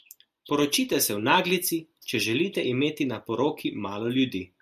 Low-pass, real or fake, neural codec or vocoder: 14.4 kHz; real; none